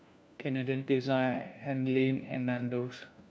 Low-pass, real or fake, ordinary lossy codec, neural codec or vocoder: none; fake; none; codec, 16 kHz, 1 kbps, FunCodec, trained on LibriTTS, 50 frames a second